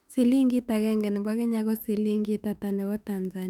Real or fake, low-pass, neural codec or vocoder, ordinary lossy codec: fake; 19.8 kHz; autoencoder, 48 kHz, 32 numbers a frame, DAC-VAE, trained on Japanese speech; none